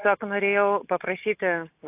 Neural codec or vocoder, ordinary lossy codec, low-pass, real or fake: none; AAC, 32 kbps; 3.6 kHz; real